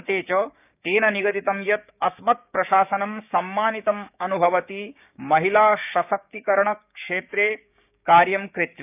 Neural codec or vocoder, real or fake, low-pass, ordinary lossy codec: codec, 16 kHz, 6 kbps, DAC; fake; 3.6 kHz; none